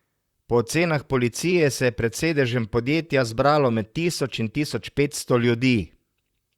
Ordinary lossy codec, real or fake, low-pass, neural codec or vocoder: Opus, 64 kbps; fake; 19.8 kHz; vocoder, 44.1 kHz, 128 mel bands, Pupu-Vocoder